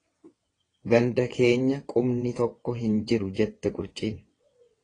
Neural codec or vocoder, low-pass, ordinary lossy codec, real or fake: vocoder, 22.05 kHz, 80 mel bands, WaveNeXt; 9.9 kHz; AAC, 32 kbps; fake